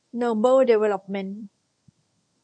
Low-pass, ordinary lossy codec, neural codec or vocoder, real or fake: 9.9 kHz; AAC, 64 kbps; codec, 24 kHz, 0.9 kbps, WavTokenizer, medium speech release version 1; fake